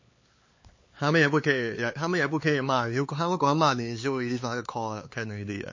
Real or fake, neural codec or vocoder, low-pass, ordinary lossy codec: fake; codec, 16 kHz, 4 kbps, X-Codec, HuBERT features, trained on LibriSpeech; 7.2 kHz; MP3, 32 kbps